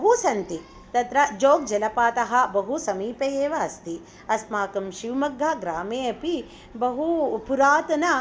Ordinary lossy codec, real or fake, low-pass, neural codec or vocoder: none; real; none; none